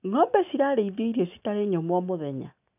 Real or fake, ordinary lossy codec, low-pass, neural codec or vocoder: real; none; 3.6 kHz; none